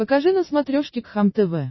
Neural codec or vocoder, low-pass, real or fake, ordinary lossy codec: none; 7.2 kHz; real; MP3, 24 kbps